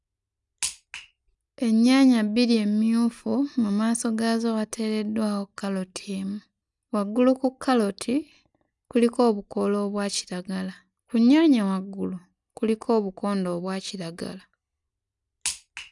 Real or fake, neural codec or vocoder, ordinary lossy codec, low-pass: real; none; none; 10.8 kHz